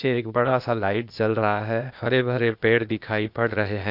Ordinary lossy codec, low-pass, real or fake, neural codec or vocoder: none; 5.4 kHz; fake; codec, 16 kHz, 0.8 kbps, ZipCodec